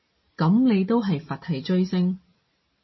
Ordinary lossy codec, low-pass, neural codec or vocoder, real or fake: MP3, 24 kbps; 7.2 kHz; none; real